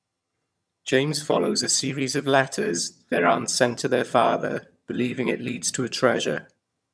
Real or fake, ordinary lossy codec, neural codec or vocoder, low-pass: fake; none; vocoder, 22.05 kHz, 80 mel bands, HiFi-GAN; none